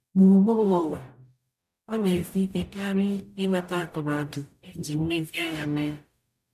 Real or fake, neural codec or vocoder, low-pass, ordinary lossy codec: fake; codec, 44.1 kHz, 0.9 kbps, DAC; 14.4 kHz; none